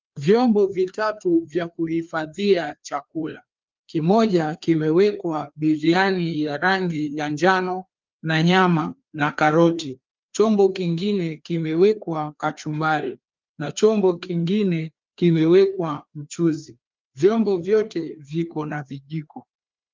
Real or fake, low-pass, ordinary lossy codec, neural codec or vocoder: fake; 7.2 kHz; Opus, 24 kbps; codec, 16 kHz, 2 kbps, FreqCodec, larger model